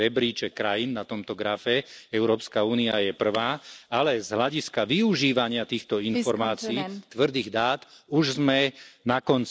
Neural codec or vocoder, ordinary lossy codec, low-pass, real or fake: none; none; none; real